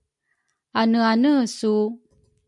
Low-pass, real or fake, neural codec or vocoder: 10.8 kHz; real; none